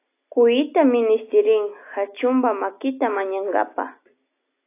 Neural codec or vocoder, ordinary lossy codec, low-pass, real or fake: none; AAC, 24 kbps; 3.6 kHz; real